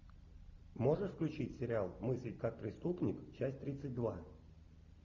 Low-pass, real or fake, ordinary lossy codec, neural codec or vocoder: 7.2 kHz; real; Opus, 64 kbps; none